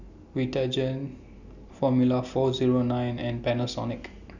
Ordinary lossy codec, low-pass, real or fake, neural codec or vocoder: none; 7.2 kHz; real; none